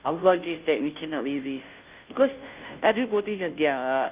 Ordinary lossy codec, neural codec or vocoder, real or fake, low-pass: Opus, 24 kbps; codec, 16 kHz, 0.5 kbps, FunCodec, trained on Chinese and English, 25 frames a second; fake; 3.6 kHz